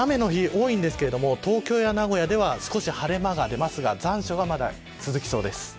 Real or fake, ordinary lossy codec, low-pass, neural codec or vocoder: real; none; none; none